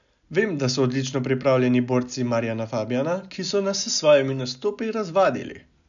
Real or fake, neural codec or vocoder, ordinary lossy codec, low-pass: real; none; none; 7.2 kHz